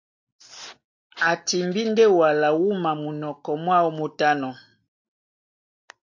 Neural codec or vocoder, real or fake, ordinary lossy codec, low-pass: none; real; AAC, 48 kbps; 7.2 kHz